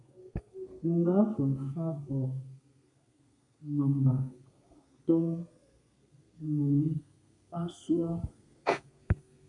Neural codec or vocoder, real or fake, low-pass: codec, 32 kHz, 1.9 kbps, SNAC; fake; 10.8 kHz